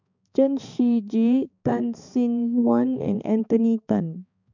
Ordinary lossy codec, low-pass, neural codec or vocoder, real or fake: none; 7.2 kHz; codec, 16 kHz, 4 kbps, X-Codec, HuBERT features, trained on balanced general audio; fake